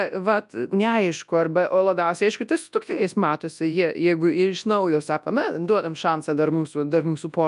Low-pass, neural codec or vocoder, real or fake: 10.8 kHz; codec, 24 kHz, 0.9 kbps, WavTokenizer, large speech release; fake